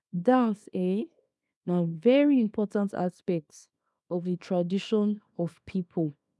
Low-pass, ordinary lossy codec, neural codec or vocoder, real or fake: none; none; codec, 24 kHz, 0.9 kbps, WavTokenizer, small release; fake